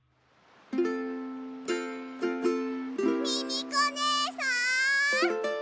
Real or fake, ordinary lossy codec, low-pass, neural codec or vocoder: real; none; none; none